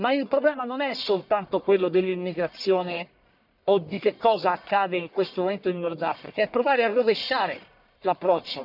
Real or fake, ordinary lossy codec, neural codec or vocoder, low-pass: fake; none; codec, 44.1 kHz, 1.7 kbps, Pupu-Codec; 5.4 kHz